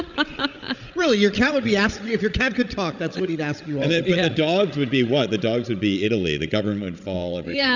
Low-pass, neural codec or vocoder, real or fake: 7.2 kHz; codec, 16 kHz, 16 kbps, FreqCodec, larger model; fake